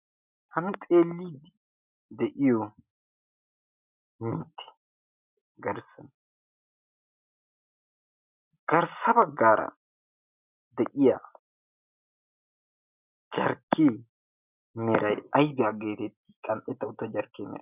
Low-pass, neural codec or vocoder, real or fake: 3.6 kHz; none; real